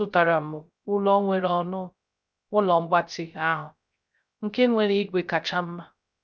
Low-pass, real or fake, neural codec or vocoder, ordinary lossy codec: none; fake; codec, 16 kHz, 0.3 kbps, FocalCodec; none